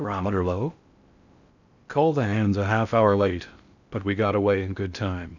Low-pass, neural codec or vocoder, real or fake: 7.2 kHz; codec, 16 kHz in and 24 kHz out, 0.6 kbps, FocalCodec, streaming, 4096 codes; fake